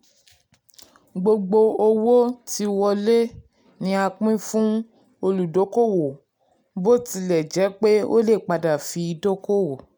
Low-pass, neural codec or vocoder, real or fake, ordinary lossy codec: none; none; real; none